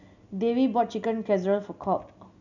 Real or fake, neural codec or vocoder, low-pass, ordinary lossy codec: real; none; 7.2 kHz; none